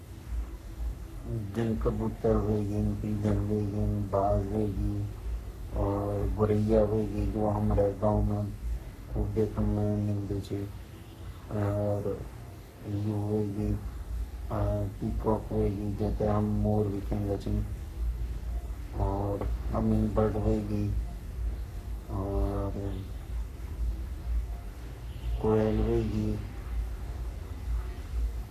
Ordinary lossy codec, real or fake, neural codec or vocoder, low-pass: none; fake; codec, 44.1 kHz, 3.4 kbps, Pupu-Codec; 14.4 kHz